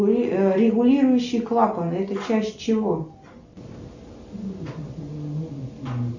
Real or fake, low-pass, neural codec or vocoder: real; 7.2 kHz; none